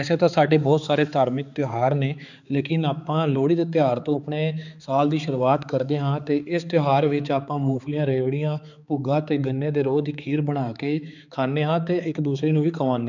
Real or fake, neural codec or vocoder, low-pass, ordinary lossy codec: fake; codec, 16 kHz, 4 kbps, X-Codec, HuBERT features, trained on balanced general audio; 7.2 kHz; none